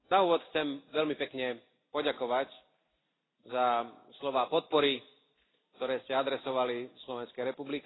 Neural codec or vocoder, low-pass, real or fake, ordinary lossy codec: none; 7.2 kHz; real; AAC, 16 kbps